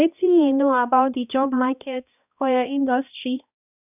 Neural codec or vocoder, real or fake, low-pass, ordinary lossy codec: codec, 16 kHz, 1 kbps, X-Codec, HuBERT features, trained on balanced general audio; fake; 3.6 kHz; none